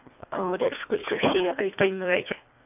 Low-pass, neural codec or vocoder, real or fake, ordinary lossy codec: 3.6 kHz; codec, 24 kHz, 1.5 kbps, HILCodec; fake; none